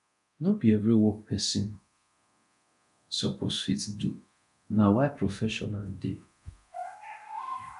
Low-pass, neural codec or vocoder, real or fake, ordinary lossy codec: 10.8 kHz; codec, 24 kHz, 0.9 kbps, DualCodec; fake; none